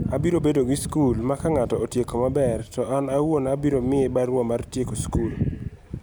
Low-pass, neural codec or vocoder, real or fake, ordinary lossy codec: none; none; real; none